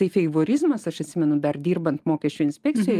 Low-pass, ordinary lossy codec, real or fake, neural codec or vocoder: 14.4 kHz; Opus, 32 kbps; real; none